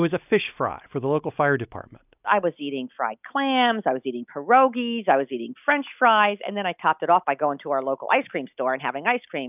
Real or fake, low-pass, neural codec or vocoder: real; 3.6 kHz; none